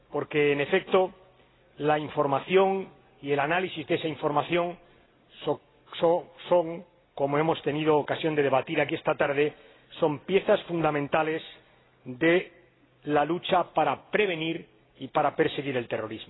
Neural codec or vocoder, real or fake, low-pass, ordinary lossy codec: none; real; 7.2 kHz; AAC, 16 kbps